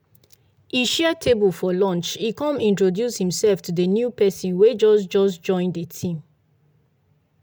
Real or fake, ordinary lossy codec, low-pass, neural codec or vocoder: real; none; none; none